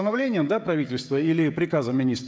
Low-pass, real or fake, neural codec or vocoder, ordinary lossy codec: none; fake; codec, 16 kHz, 16 kbps, FreqCodec, smaller model; none